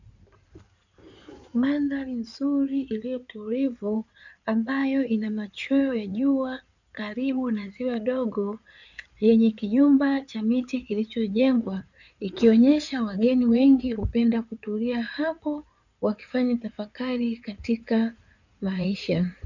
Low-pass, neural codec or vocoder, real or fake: 7.2 kHz; codec, 16 kHz in and 24 kHz out, 2.2 kbps, FireRedTTS-2 codec; fake